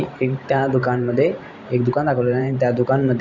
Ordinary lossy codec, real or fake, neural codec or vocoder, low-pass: none; fake; vocoder, 44.1 kHz, 128 mel bands every 512 samples, BigVGAN v2; 7.2 kHz